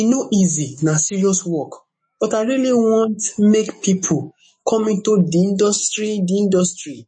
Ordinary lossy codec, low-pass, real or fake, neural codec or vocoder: MP3, 32 kbps; 9.9 kHz; fake; autoencoder, 48 kHz, 128 numbers a frame, DAC-VAE, trained on Japanese speech